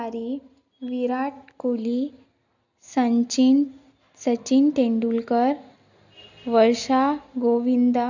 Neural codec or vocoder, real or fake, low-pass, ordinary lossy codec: none; real; 7.2 kHz; none